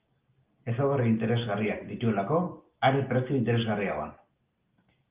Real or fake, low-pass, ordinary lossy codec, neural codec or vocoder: real; 3.6 kHz; Opus, 32 kbps; none